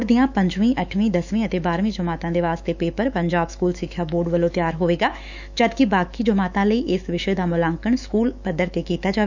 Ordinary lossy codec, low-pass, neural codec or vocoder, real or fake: none; 7.2 kHz; autoencoder, 48 kHz, 128 numbers a frame, DAC-VAE, trained on Japanese speech; fake